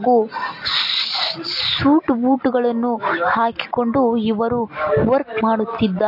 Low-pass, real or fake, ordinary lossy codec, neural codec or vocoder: 5.4 kHz; real; MP3, 32 kbps; none